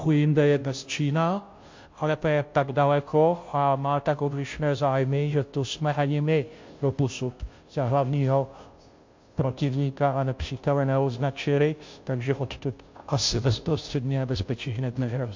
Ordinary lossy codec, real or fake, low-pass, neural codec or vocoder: MP3, 48 kbps; fake; 7.2 kHz; codec, 16 kHz, 0.5 kbps, FunCodec, trained on Chinese and English, 25 frames a second